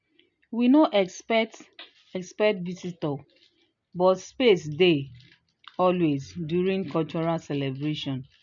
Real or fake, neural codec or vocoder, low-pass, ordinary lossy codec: real; none; 7.2 kHz; MP3, 64 kbps